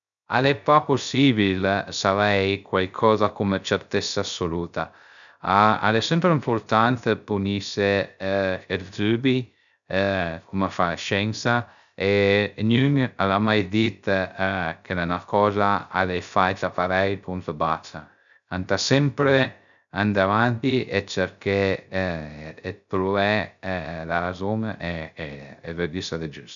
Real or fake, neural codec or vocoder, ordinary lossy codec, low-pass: fake; codec, 16 kHz, 0.3 kbps, FocalCodec; none; 7.2 kHz